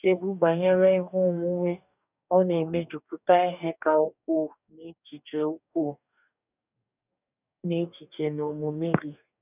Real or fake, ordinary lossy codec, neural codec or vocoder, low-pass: fake; none; codec, 44.1 kHz, 2.6 kbps, DAC; 3.6 kHz